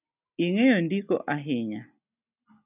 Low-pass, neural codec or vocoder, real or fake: 3.6 kHz; none; real